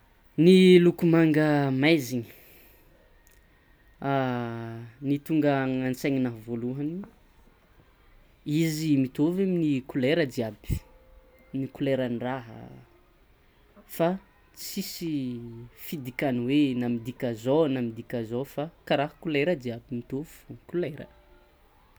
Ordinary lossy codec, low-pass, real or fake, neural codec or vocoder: none; none; real; none